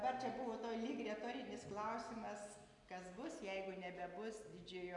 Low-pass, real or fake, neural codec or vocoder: 10.8 kHz; real; none